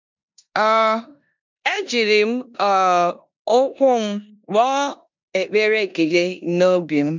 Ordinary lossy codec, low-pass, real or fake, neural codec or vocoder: MP3, 64 kbps; 7.2 kHz; fake; codec, 16 kHz in and 24 kHz out, 0.9 kbps, LongCat-Audio-Codec, four codebook decoder